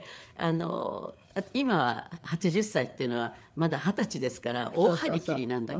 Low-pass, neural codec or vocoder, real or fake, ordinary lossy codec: none; codec, 16 kHz, 4 kbps, FreqCodec, larger model; fake; none